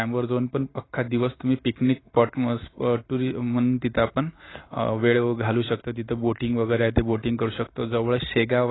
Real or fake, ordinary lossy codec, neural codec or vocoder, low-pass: real; AAC, 16 kbps; none; 7.2 kHz